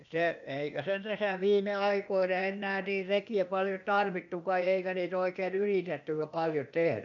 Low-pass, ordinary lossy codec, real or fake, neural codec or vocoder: 7.2 kHz; none; fake; codec, 16 kHz, 0.8 kbps, ZipCodec